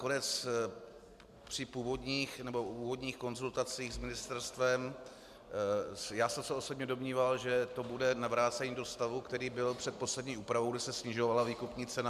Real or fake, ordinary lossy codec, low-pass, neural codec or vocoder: real; MP3, 96 kbps; 14.4 kHz; none